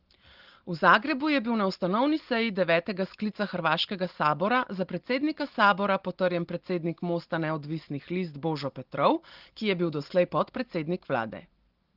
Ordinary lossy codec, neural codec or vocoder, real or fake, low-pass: Opus, 16 kbps; none; real; 5.4 kHz